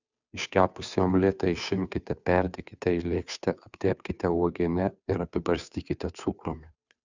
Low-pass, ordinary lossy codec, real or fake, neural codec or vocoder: 7.2 kHz; Opus, 64 kbps; fake; codec, 16 kHz, 2 kbps, FunCodec, trained on Chinese and English, 25 frames a second